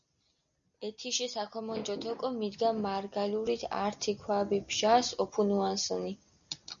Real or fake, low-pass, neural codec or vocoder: real; 7.2 kHz; none